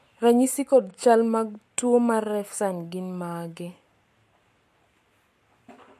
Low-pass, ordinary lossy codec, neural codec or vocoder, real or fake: 14.4 kHz; MP3, 64 kbps; codec, 44.1 kHz, 7.8 kbps, Pupu-Codec; fake